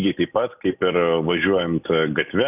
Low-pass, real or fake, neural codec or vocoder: 3.6 kHz; real; none